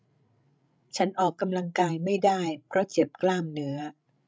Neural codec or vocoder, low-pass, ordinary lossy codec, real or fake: codec, 16 kHz, 16 kbps, FreqCodec, larger model; none; none; fake